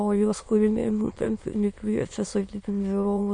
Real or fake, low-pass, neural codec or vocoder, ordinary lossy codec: fake; 9.9 kHz; autoencoder, 22.05 kHz, a latent of 192 numbers a frame, VITS, trained on many speakers; MP3, 48 kbps